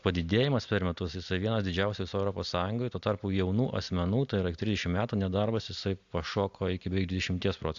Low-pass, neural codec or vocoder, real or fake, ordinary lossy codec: 7.2 kHz; none; real; AAC, 64 kbps